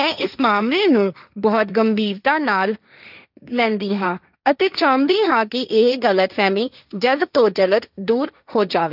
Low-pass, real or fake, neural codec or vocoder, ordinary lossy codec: 5.4 kHz; fake; codec, 16 kHz, 1.1 kbps, Voila-Tokenizer; none